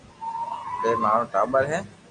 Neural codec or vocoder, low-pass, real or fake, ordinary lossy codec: none; 9.9 kHz; real; MP3, 48 kbps